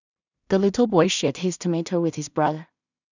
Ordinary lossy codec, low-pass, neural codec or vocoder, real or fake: none; 7.2 kHz; codec, 16 kHz in and 24 kHz out, 0.4 kbps, LongCat-Audio-Codec, two codebook decoder; fake